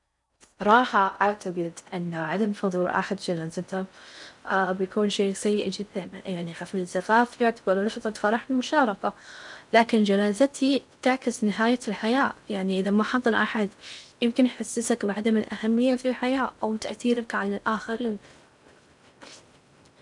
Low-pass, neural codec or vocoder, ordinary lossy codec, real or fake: 10.8 kHz; codec, 16 kHz in and 24 kHz out, 0.6 kbps, FocalCodec, streaming, 4096 codes; none; fake